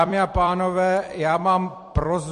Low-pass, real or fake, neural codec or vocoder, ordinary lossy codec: 14.4 kHz; fake; vocoder, 44.1 kHz, 128 mel bands every 256 samples, BigVGAN v2; MP3, 48 kbps